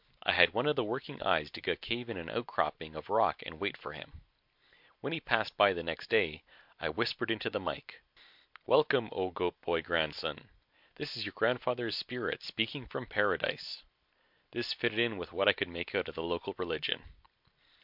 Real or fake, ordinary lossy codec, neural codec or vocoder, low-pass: real; MP3, 48 kbps; none; 5.4 kHz